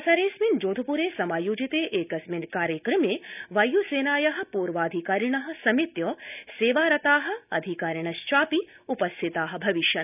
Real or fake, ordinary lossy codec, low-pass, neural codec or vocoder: real; none; 3.6 kHz; none